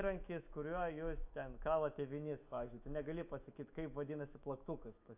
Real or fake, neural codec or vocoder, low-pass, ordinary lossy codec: fake; autoencoder, 48 kHz, 128 numbers a frame, DAC-VAE, trained on Japanese speech; 3.6 kHz; AAC, 32 kbps